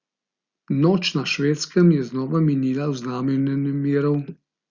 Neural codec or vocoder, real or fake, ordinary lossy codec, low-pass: none; real; Opus, 64 kbps; 7.2 kHz